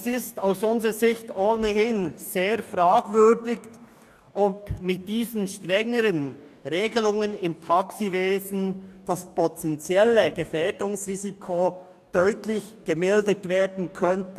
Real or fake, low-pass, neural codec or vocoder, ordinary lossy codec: fake; 14.4 kHz; codec, 44.1 kHz, 2.6 kbps, DAC; none